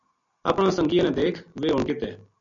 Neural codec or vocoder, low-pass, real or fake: none; 7.2 kHz; real